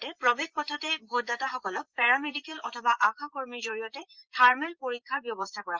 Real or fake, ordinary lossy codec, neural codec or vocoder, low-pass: fake; Opus, 32 kbps; vocoder, 44.1 kHz, 128 mel bands, Pupu-Vocoder; 7.2 kHz